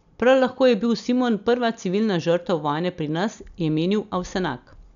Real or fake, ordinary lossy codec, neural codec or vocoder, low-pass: real; none; none; 7.2 kHz